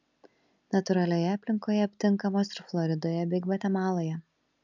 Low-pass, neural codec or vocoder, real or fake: 7.2 kHz; none; real